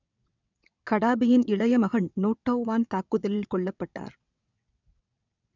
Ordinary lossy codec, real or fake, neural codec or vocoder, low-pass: none; fake; vocoder, 22.05 kHz, 80 mel bands, WaveNeXt; 7.2 kHz